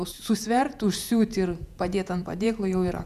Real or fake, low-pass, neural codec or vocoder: fake; 14.4 kHz; vocoder, 48 kHz, 128 mel bands, Vocos